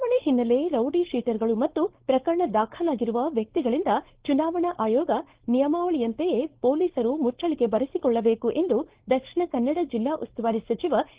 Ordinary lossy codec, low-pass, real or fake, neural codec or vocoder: Opus, 16 kbps; 3.6 kHz; fake; codec, 16 kHz, 4.8 kbps, FACodec